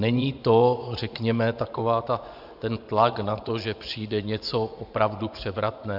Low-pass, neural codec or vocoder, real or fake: 5.4 kHz; vocoder, 24 kHz, 100 mel bands, Vocos; fake